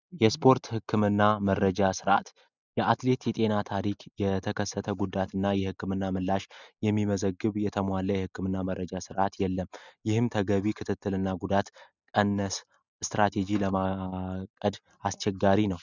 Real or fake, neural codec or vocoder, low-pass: real; none; 7.2 kHz